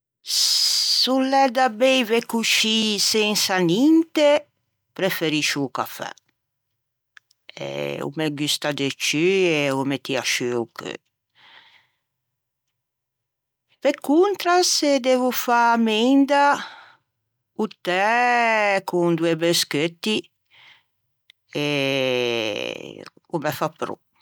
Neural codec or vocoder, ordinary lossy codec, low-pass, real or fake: none; none; none; real